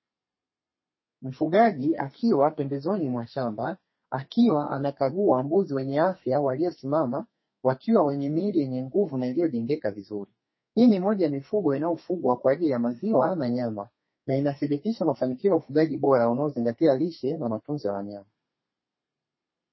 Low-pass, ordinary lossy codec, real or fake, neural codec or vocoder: 7.2 kHz; MP3, 24 kbps; fake; codec, 32 kHz, 1.9 kbps, SNAC